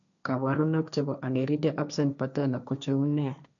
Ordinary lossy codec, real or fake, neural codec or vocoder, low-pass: none; fake; codec, 16 kHz, 1.1 kbps, Voila-Tokenizer; 7.2 kHz